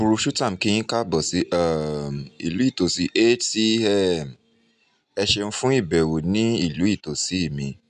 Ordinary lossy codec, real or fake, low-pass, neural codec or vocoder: none; real; 10.8 kHz; none